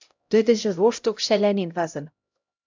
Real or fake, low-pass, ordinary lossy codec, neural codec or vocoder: fake; 7.2 kHz; MP3, 64 kbps; codec, 16 kHz, 0.5 kbps, X-Codec, HuBERT features, trained on LibriSpeech